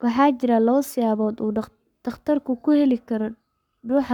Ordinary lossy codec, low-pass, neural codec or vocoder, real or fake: none; 19.8 kHz; codec, 44.1 kHz, 7.8 kbps, Pupu-Codec; fake